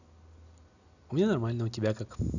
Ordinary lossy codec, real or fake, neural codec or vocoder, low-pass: none; real; none; 7.2 kHz